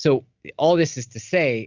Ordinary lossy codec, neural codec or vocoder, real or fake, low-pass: Opus, 64 kbps; none; real; 7.2 kHz